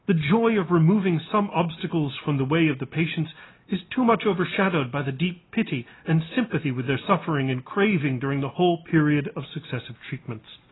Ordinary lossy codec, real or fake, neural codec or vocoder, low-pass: AAC, 16 kbps; real; none; 7.2 kHz